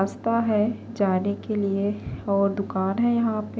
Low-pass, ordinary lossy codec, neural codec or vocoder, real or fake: none; none; none; real